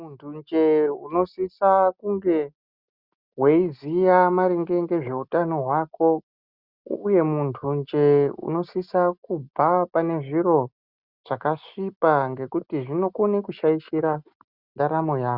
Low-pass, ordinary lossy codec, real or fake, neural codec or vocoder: 5.4 kHz; Opus, 64 kbps; real; none